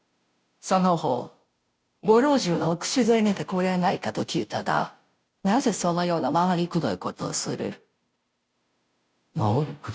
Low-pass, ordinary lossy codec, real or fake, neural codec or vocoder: none; none; fake; codec, 16 kHz, 0.5 kbps, FunCodec, trained on Chinese and English, 25 frames a second